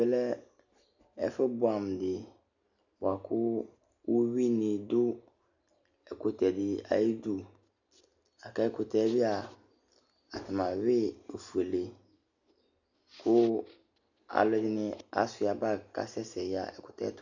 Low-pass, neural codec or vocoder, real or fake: 7.2 kHz; none; real